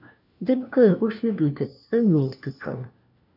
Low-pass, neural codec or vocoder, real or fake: 5.4 kHz; codec, 16 kHz, 1 kbps, FunCodec, trained on LibriTTS, 50 frames a second; fake